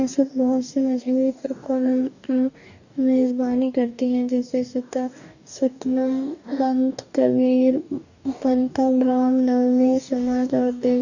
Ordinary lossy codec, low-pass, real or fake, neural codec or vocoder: none; 7.2 kHz; fake; codec, 44.1 kHz, 2.6 kbps, DAC